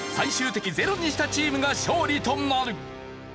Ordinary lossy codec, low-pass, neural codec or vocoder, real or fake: none; none; none; real